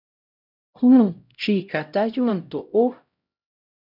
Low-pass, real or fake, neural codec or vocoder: 5.4 kHz; fake; codec, 16 kHz, 0.5 kbps, X-Codec, HuBERT features, trained on LibriSpeech